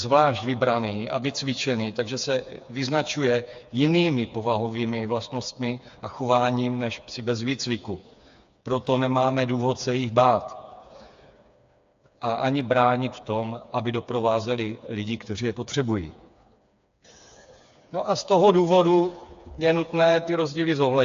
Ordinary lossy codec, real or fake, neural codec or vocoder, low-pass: AAC, 64 kbps; fake; codec, 16 kHz, 4 kbps, FreqCodec, smaller model; 7.2 kHz